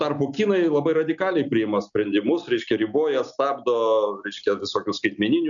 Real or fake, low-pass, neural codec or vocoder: real; 7.2 kHz; none